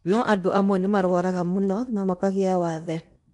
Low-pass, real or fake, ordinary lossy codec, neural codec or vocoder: 10.8 kHz; fake; none; codec, 16 kHz in and 24 kHz out, 0.8 kbps, FocalCodec, streaming, 65536 codes